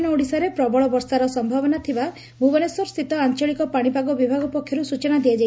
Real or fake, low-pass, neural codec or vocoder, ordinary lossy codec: real; none; none; none